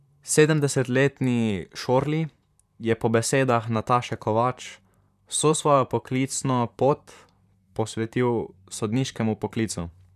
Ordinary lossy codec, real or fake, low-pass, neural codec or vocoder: none; fake; 14.4 kHz; vocoder, 44.1 kHz, 128 mel bands, Pupu-Vocoder